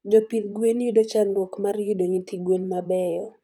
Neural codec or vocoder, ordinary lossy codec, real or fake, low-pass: vocoder, 44.1 kHz, 128 mel bands, Pupu-Vocoder; none; fake; 19.8 kHz